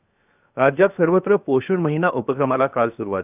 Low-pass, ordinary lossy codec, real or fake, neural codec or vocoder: 3.6 kHz; none; fake; codec, 16 kHz, 0.7 kbps, FocalCodec